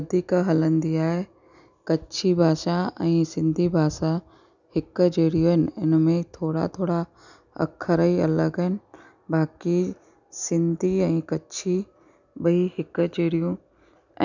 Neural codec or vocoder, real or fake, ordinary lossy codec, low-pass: none; real; none; 7.2 kHz